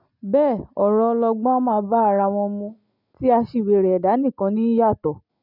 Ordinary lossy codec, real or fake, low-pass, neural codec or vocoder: none; real; 5.4 kHz; none